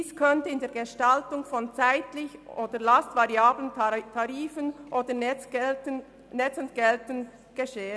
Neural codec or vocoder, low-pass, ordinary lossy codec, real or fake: none; none; none; real